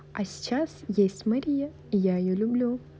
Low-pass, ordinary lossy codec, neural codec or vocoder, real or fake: none; none; none; real